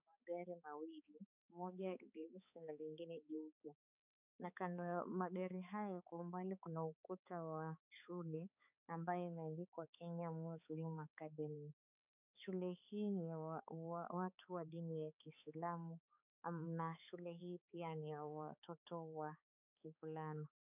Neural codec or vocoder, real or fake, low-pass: codec, 16 kHz, 4 kbps, X-Codec, HuBERT features, trained on balanced general audio; fake; 3.6 kHz